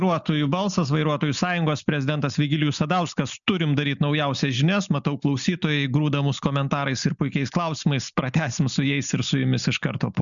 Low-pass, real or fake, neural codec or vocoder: 7.2 kHz; real; none